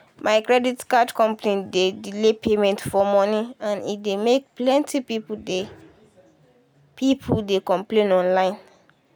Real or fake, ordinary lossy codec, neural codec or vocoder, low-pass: real; none; none; none